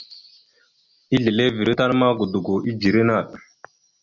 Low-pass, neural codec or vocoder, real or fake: 7.2 kHz; none; real